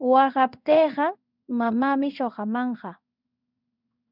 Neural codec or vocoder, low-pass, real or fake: codec, 16 kHz in and 24 kHz out, 1 kbps, XY-Tokenizer; 5.4 kHz; fake